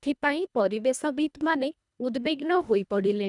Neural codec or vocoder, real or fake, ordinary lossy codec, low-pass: codec, 24 kHz, 1.5 kbps, HILCodec; fake; none; 10.8 kHz